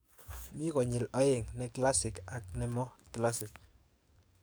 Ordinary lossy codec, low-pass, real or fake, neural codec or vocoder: none; none; fake; codec, 44.1 kHz, 7.8 kbps, DAC